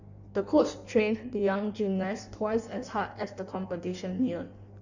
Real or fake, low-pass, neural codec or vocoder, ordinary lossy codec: fake; 7.2 kHz; codec, 16 kHz in and 24 kHz out, 1.1 kbps, FireRedTTS-2 codec; none